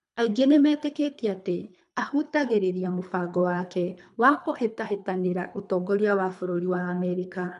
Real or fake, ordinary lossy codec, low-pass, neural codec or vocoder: fake; none; 10.8 kHz; codec, 24 kHz, 3 kbps, HILCodec